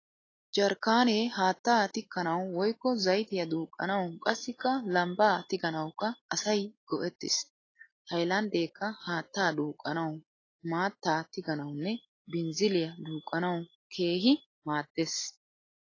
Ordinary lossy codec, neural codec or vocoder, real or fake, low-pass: AAC, 32 kbps; none; real; 7.2 kHz